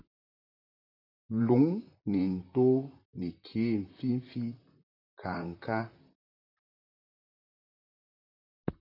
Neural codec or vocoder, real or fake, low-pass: vocoder, 44.1 kHz, 128 mel bands, Pupu-Vocoder; fake; 5.4 kHz